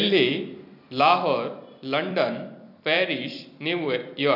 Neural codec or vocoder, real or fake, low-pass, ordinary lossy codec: vocoder, 44.1 kHz, 128 mel bands every 256 samples, BigVGAN v2; fake; 5.4 kHz; none